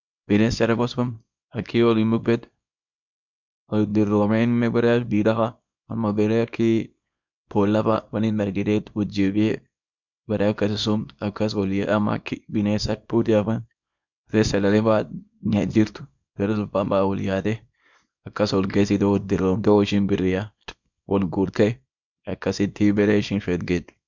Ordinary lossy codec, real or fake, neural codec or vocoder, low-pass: MP3, 64 kbps; fake; codec, 24 kHz, 0.9 kbps, WavTokenizer, small release; 7.2 kHz